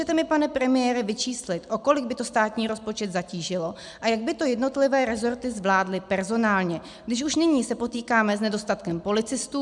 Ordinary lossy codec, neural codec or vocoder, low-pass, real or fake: MP3, 96 kbps; none; 10.8 kHz; real